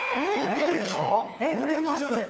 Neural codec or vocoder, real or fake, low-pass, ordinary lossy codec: codec, 16 kHz, 4 kbps, FunCodec, trained on LibriTTS, 50 frames a second; fake; none; none